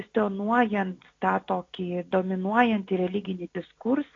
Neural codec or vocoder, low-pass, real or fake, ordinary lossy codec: none; 7.2 kHz; real; AAC, 32 kbps